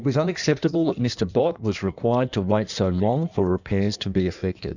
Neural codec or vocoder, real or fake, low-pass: codec, 16 kHz in and 24 kHz out, 1.1 kbps, FireRedTTS-2 codec; fake; 7.2 kHz